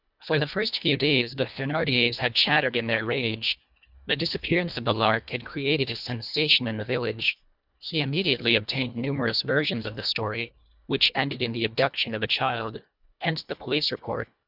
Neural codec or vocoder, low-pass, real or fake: codec, 24 kHz, 1.5 kbps, HILCodec; 5.4 kHz; fake